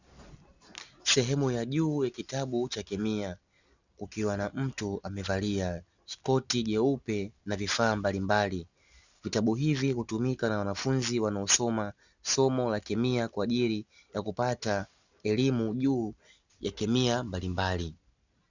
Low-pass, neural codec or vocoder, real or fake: 7.2 kHz; none; real